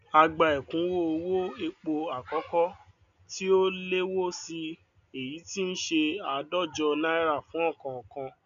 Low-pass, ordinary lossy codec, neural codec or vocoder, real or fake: 7.2 kHz; none; none; real